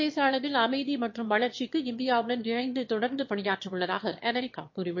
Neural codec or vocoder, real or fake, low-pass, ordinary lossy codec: autoencoder, 22.05 kHz, a latent of 192 numbers a frame, VITS, trained on one speaker; fake; 7.2 kHz; MP3, 32 kbps